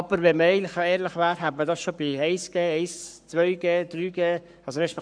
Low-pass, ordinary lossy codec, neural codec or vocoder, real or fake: 9.9 kHz; none; codec, 44.1 kHz, 7.8 kbps, Pupu-Codec; fake